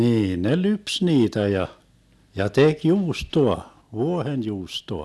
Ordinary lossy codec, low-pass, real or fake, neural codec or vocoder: none; none; real; none